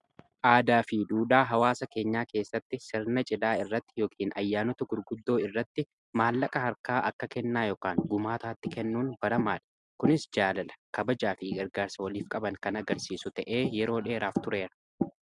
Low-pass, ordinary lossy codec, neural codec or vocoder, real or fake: 10.8 kHz; MP3, 96 kbps; none; real